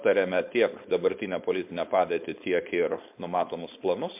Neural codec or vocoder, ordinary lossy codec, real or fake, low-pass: codec, 16 kHz, 4.8 kbps, FACodec; MP3, 32 kbps; fake; 3.6 kHz